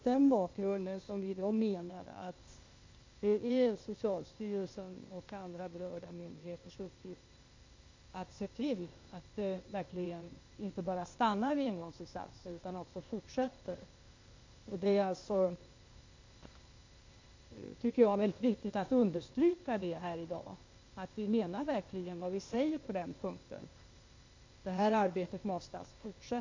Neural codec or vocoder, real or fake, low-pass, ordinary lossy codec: codec, 16 kHz, 0.8 kbps, ZipCodec; fake; 7.2 kHz; MP3, 48 kbps